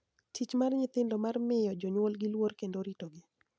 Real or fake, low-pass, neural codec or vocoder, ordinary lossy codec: real; none; none; none